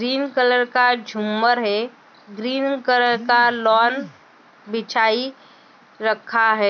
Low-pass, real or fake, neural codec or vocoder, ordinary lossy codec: 7.2 kHz; real; none; none